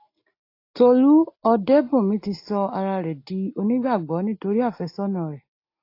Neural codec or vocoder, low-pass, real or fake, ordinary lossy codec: none; 5.4 kHz; real; AAC, 32 kbps